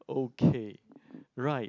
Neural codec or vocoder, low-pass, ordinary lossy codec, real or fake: none; 7.2 kHz; none; real